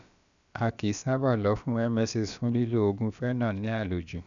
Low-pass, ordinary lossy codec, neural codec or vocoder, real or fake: 7.2 kHz; none; codec, 16 kHz, about 1 kbps, DyCAST, with the encoder's durations; fake